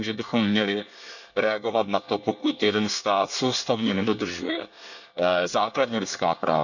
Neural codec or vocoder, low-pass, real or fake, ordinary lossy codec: codec, 24 kHz, 1 kbps, SNAC; 7.2 kHz; fake; none